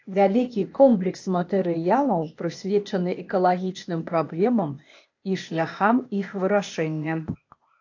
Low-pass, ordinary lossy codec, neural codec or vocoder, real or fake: 7.2 kHz; AAC, 48 kbps; codec, 16 kHz, 0.8 kbps, ZipCodec; fake